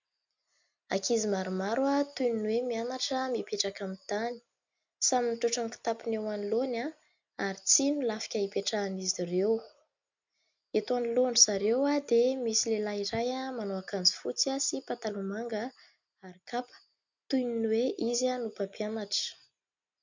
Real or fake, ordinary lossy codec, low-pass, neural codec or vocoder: real; MP3, 64 kbps; 7.2 kHz; none